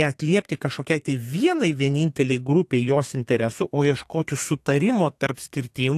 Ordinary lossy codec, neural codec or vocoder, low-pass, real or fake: AAC, 64 kbps; codec, 32 kHz, 1.9 kbps, SNAC; 14.4 kHz; fake